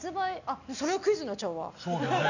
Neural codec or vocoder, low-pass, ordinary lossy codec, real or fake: none; 7.2 kHz; none; real